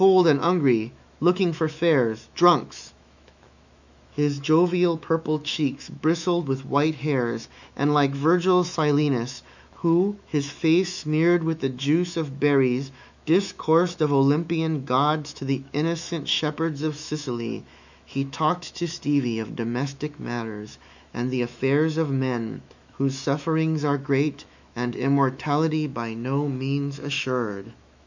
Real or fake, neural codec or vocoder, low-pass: fake; autoencoder, 48 kHz, 128 numbers a frame, DAC-VAE, trained on Japanese speech; 7.2 kHz